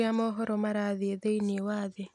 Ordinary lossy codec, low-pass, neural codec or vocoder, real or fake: none; none; none; real